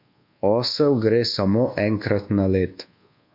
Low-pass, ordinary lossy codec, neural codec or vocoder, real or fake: 5.4 kHz; MP3, 48 kbps; codec, 24 kHz, 1.2 kbps, DualCodec; fake